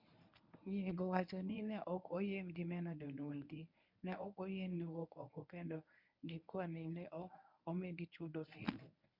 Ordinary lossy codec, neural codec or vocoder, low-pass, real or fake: none; codec, 24 kHz, 0.9 kbps, WavTokenizer, medium speech release version 1; 5.4 kHz; fake